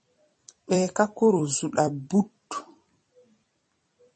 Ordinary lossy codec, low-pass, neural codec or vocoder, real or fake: MP3, 32 kbps; 10.8 kHz; vocoder, 44.1 kHz, 128 mel bands every 256 samples, BigVGAN v2; fake